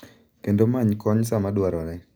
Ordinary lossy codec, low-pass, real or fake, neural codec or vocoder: none; none; real; none